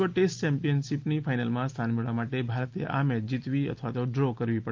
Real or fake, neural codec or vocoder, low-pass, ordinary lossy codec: real; none; 7.2 kHz; Opus, 32 kbps